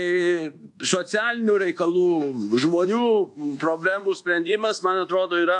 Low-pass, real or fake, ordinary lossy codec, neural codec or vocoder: 10.8 kHz; fake; AAC, 64 kbps; codec, 24 kHz, 1.2 kbps, DualCodec